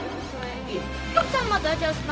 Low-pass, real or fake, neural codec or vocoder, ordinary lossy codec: none; fake; codec, 16 kHz, 0.4 kbps, LongCat-Audio-Codec; none